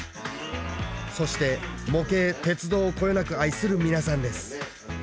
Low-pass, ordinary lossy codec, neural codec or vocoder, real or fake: none; none; none; real